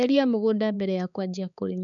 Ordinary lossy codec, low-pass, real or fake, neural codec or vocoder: none; 7.2 kHz; fake; codec, 16 kHz, 4 kbps, X-Codec, HuBERT features, trained on balanced general audio